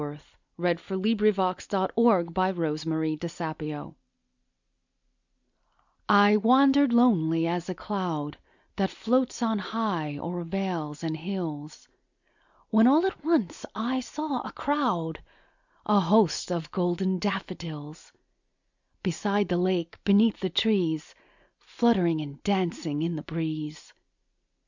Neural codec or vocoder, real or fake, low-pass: none; real; 7.2 kHz